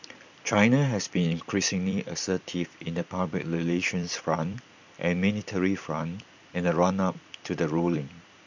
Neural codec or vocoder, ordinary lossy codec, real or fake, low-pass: vocoder, 44.1 kHz, 128 mel bands every 256 samples, BigVGAN v2; none; fake; 7.2 kHz